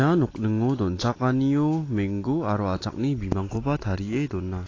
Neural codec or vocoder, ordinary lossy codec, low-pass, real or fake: none; AAC, 32 kbps; 7.2 kHz; real